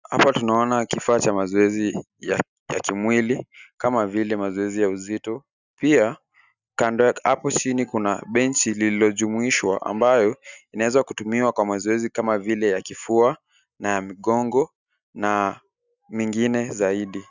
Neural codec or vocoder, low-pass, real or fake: none; 7.2 kHz; real